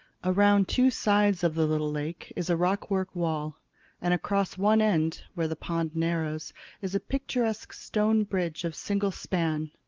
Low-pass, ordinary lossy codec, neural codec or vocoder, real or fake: 7.2 kHz; Opus, 32 kbps; none; real